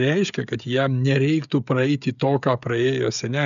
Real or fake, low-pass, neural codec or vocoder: fake; 7.2 kHz; codec, 16 kHz, 16 kbps, FreqCodec, smaller model